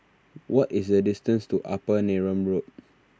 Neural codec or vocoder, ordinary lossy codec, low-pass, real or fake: none; none; none; real